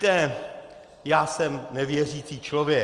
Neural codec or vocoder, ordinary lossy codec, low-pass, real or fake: none; Opus, 32 kbps; 10.8 kHz; real